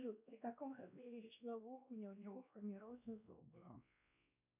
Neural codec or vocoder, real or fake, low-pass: codec, 16 kHz, 1 kbps, X-Codec, WavLM features, trained on Multilingual LibriSpeech; fake; 3.6 kHz